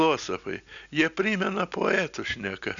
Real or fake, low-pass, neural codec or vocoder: real; 7.2 kHz; none